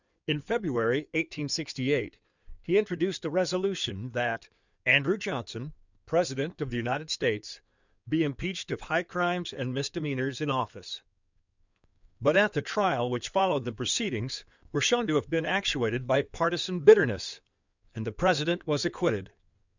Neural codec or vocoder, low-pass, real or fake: codec, 16 kHz in and 24 kHz out, 2.2 kbps, FireRedTTS-2 codec; 7.2 kHz; fake